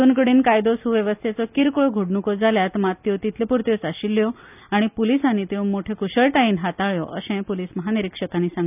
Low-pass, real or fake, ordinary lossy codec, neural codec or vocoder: 3.6 kHz; real; none; none